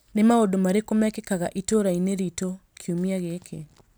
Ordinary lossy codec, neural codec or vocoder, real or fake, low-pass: none; none; real; none